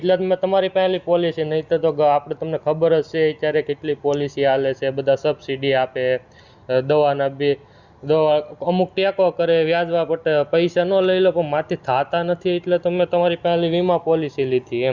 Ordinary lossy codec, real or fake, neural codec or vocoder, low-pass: Opus, 64 kbps; real; none; 7.2 kHz